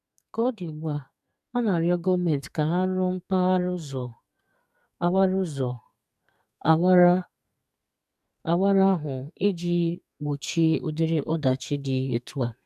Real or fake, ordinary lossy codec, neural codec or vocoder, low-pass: fake; none; codec, 44.1 kHz, 2.6 kbps, SNAC; 14.4 kHz